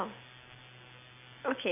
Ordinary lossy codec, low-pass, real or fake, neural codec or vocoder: none; 3.6 kHz; real; none